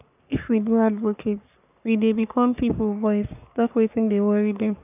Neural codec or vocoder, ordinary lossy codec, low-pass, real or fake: codec, 44.1 kHz, 3.4 kbps, Pupu-Codec; none; 3.6 kHz; fake